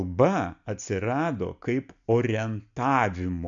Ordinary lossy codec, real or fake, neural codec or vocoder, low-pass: MP3, 64 kbps; real; none; 7.2 kHz